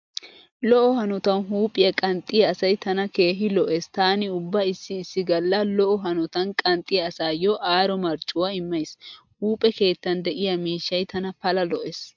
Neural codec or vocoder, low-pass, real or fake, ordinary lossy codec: none; 7.2 kHz; real; MP3, 64 kbps